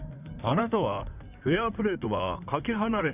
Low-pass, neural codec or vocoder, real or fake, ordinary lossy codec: 3.6 kHz; codec, 16 kHz in and 24 kHz out, 2.2 kbps, FireRedTTS-2 codec; fake; none